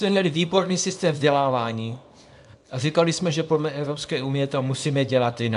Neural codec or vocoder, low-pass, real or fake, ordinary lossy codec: codec, 24 kHz, 0.9 kbps, WavTokenizer, small release; 10.8 kHz; fake; AAC, 96 kbps